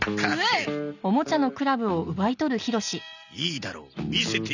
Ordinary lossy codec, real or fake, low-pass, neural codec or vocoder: none; real; 7.2 kHz; none